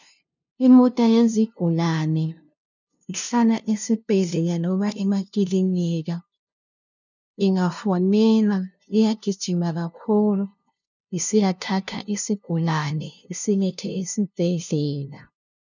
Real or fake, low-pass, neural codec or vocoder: fake; 7.2 kHz; codec, 16 kHz, 0.5 kbps, FunCodec, trained on LibriTTS, 25 frames a second